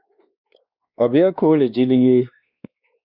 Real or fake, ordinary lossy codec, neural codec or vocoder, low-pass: fake; Opus, 64 kbps; codec, 16 kHz, 2 kbps, X-Codec, WavLM features, trained on Multilingual LibriSpeech; 5.4 kHz